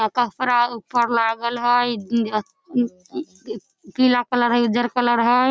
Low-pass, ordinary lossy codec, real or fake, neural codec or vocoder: none; none; real; none